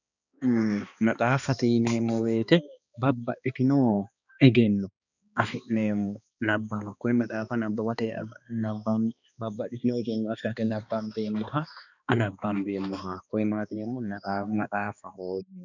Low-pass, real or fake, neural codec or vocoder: 7.2 kHz; fake; codec, 16 kHz, 2 kbps, X-Codec, HuBERT features, trained on balanced general audio